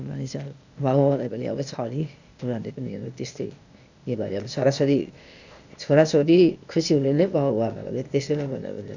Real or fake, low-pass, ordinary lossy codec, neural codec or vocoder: fake; 7.2 kHz; none; codec, 16 kHz, 0.8 kbps, ZipCodec